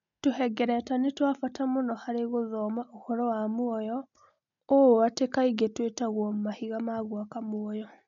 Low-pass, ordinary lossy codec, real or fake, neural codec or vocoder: 7.2 kHz; none; real; none